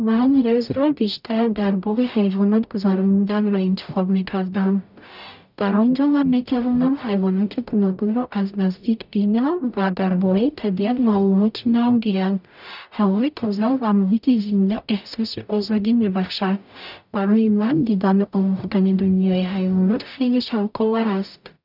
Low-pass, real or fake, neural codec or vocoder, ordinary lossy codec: 5.4 kHz; fake; codec, 44.1 kHz, 0.9 kbps, DAC; none